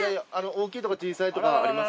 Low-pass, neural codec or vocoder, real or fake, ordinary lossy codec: none; none; real; none